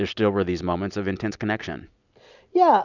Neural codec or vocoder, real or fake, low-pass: none; real; 7.2 kHz